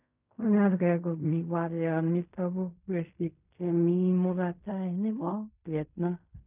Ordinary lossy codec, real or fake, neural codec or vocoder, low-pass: none; fake; codec, 16 kHz in and 24 kHz out, 0.4 kbps, LongCat-Audio-Codec, fine tuned four codebook decoder; 3.6 kHz